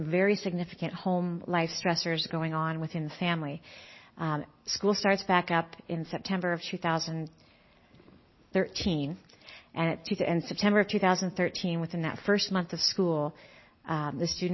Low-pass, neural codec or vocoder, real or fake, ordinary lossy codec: 7.2 kHz; none; real; MP3, 24 kbps